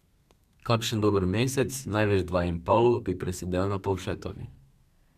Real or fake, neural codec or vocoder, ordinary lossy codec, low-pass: fake; codec, 32 kHz, 1.9 kbps, SNAC; none; 14.4 kHz